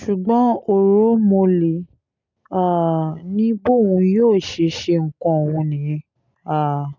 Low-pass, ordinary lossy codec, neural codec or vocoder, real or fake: 7.2 kHz; none; none; real